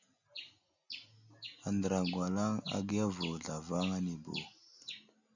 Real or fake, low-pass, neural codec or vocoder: real; 7.2 kHz; none